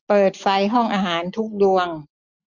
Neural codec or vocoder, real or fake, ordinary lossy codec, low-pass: none; real; none; 7.2 kHz